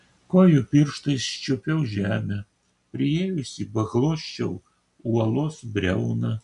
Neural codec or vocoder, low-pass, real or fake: none; 10.8 kHz; real